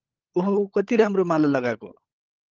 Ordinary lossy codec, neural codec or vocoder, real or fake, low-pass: Opus, 32 kbps; codec, 16 kHz, 16 kbps, FunCodec, trained on LibriTTS, 50 frames a second; fake; 7.2 kHz